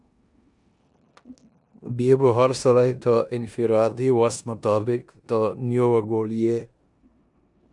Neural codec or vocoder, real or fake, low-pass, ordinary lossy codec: codec, 16 kHz in and 24 kHz out, 0.9 kbps, LongCat-Audio-Codec, four codebook decoder; fake; 10.8 kHz; MP3, 96 kbps